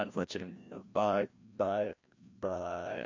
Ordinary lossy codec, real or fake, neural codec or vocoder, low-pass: MP3, 48 kbps; fake; codec, 16 kHz, 1 kbps, FreqCodec, larger model; 7.2 kHz